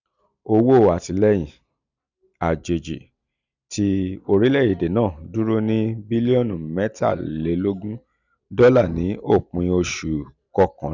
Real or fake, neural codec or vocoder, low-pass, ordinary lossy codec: real; none; 7.2 kHz; none